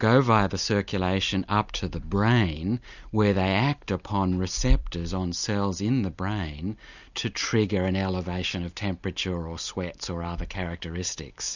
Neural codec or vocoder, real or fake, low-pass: none; real; 7.2 kHz